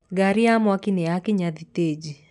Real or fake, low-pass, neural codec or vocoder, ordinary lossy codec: real; 10.8 kHz; none; none